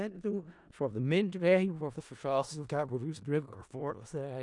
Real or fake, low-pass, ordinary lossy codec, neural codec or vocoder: fake; 10.8 kHz; none; codec, 16 kHz in and 24 kHz out, 0.4 kbps, LongCat-Audio-Codec, four codebook decoder